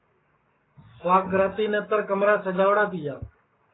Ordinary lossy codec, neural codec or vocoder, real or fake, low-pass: AAC, 16 kbps; codec, 44.1 kHz, 7.8 kbps, Pupu-Codec; fake; 7.2 kHz